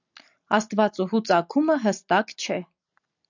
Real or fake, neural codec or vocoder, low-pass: real; none; 7.2 kHz